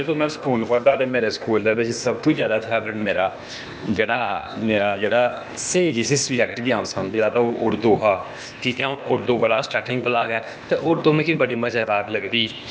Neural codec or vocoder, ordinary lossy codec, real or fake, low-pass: codec, 16 kHz, 0.8 kbps, ZipCodec; none; fake; none